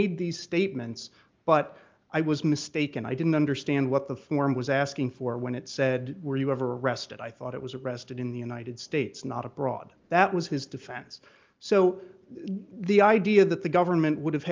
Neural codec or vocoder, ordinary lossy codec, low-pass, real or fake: none; Opus, 24 kbps; 7.2 kHz; real